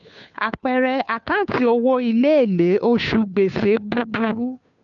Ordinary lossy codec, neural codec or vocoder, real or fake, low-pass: none; codec, 16 kHz, 2 kbps, FunCodec, trained on Chinese and English, 25 frames a second; fake; 7.2 kHz